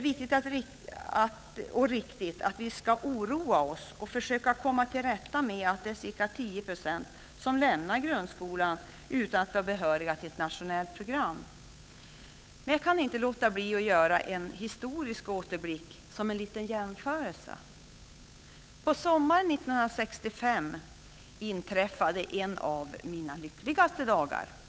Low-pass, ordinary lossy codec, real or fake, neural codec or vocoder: none; none; fake; codec, 16 kHz, 8 kbps, FunCodec, trained on Chinese and English, 25 frames a second